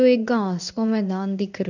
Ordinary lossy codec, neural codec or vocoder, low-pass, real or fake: none; codec, 16 kHz in and 24 kHz out, 1 kbps, XY-Tokenizer; 7.2 kHz; fake